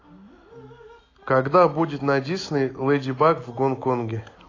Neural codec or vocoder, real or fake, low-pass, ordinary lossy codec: none; real; 7.2 kHz; AAC, 32 kbps